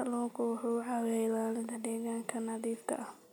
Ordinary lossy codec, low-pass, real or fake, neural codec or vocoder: none; none; real; none